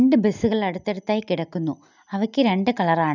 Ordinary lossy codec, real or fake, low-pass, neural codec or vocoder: none; real; 7.2 kHz; none